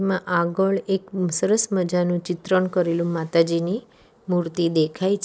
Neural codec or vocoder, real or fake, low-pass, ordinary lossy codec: none; real; none; none